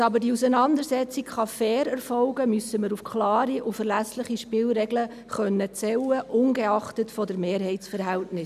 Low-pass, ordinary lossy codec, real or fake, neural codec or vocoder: 14.4 kHz; none; real; none